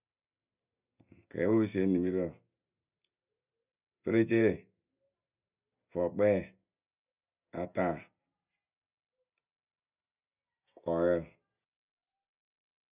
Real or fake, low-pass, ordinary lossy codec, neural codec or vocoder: real; 3.6 kHz; none; none